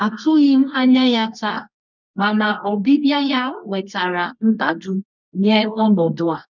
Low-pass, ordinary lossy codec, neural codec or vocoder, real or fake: 7.2 kHz; none; codec, 24 kHz, 0.9 kbps, WavTokenizer, medium music audio release; fake